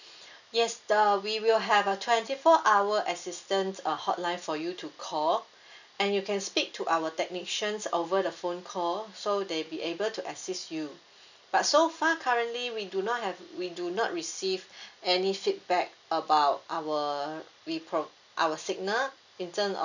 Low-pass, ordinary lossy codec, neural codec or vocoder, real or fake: 7.2 kHz; none; none; real